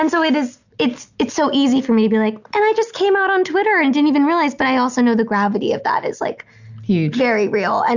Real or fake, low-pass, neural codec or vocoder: real; 7.2 kHz; none